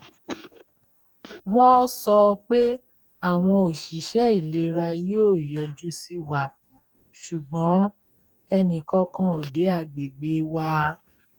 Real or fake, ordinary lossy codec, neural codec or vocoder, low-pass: fake; none; codec, 44.1 kHz, 2.6 kbps, DAC; 19.8 kHz